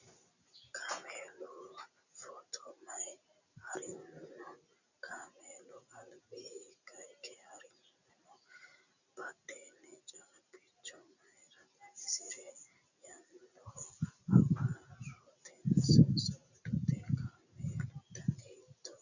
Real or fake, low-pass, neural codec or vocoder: real; 7.2 kHz; none